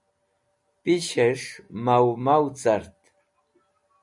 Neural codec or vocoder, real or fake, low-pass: none; real; 10.8 kHz